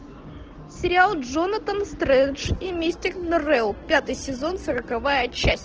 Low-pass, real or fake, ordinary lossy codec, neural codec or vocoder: 7.2 kHz; real; Opus, 16 kbps; none